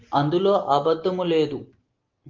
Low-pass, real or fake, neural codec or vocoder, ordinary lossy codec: 7.2 kHz; real; none; Opus, 32 kbps